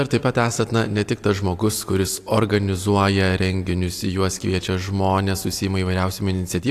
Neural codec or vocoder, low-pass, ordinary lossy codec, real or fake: none; 14.4 kHz; AAC, 64 kbps; real